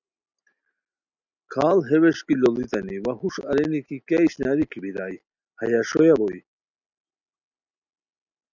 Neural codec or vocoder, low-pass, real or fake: none; 7.2 kHz; real